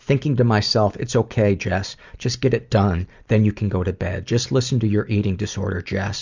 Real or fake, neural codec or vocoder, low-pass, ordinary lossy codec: fake; vocoder, 44.1 kHz, 128 mel bands every 256 samples, BigVGAN v2; 7.2 kHz; Opus, 64 kbps